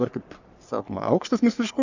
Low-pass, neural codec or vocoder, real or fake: 7.2 kHz; codec, 44.1 kHz, 3.4 kbps, Pupu-Codec; fake